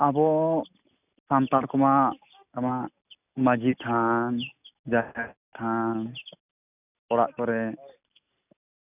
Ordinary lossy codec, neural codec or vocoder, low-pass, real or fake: none; none; 3.6 kHz; real